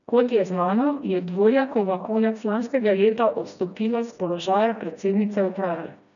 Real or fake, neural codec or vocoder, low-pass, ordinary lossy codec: fake; codec, 16 kHz, 1 kbps, FreqCodec, smaller model; 7.2 kHz; none